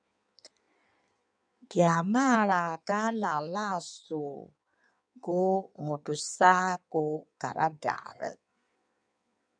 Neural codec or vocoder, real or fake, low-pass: codec, 16 kHz in and 24 kHz out, 1.1 kbps, FireRedTTS-2 codec; fake; 9.9 kHz